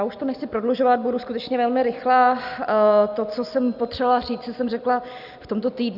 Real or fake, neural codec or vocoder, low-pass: real; none; 5.4 kHz